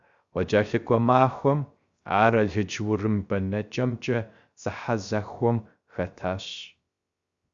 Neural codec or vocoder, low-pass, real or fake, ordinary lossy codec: codec, 16 kHz, 0.3 kbps, FocalCodec; 7.2 kHz; fake; Opus, 64 kbps